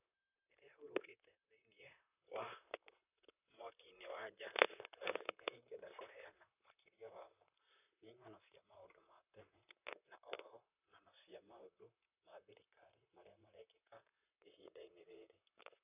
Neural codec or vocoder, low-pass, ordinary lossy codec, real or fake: vocoder, 44.1 kHz, 128 mel bands, Pupu-Vocoder; 3.6 kHz; none; fake